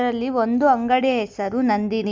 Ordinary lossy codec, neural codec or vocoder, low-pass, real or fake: none; none; none; real